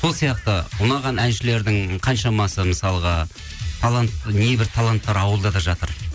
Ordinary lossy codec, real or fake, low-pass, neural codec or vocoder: none; real; none; none